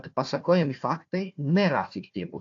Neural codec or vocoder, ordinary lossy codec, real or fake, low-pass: codec, 16 kHz, 1 kbps, FunCodec, trained on Chinese and English, 50 frames a second; AAC, 48 kbps; fake; 7.2 kHz